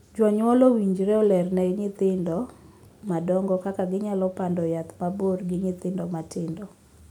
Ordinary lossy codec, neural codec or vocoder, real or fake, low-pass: none; none; real; 19.8 kHz